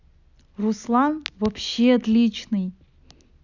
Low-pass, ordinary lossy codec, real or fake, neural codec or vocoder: 7.2 kHz; none; real; none